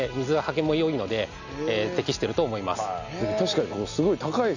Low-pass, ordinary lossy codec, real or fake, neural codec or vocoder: 7.2 kHz; none; real; none